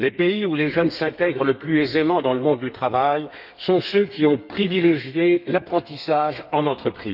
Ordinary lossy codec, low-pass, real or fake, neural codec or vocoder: none; 5.4 kHz; fake; codec, 32 kHz, 1.9 kbps, SNAC